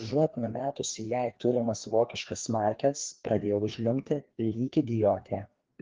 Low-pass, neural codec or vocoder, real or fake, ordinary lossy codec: 7.2 kHz; codec, 16 kHz, 2 kbps, FreqCodec, larger model; fake; Opus, 16 kbps